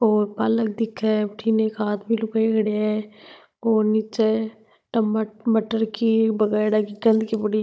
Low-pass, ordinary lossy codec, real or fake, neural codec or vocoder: none; none; fake; codec, 16 kHz, 16 kbps, FunCodec, trained on Chinese and English, 50 frames a second